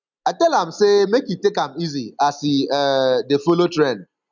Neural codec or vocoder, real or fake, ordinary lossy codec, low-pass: none; real; none; 7.2 kHz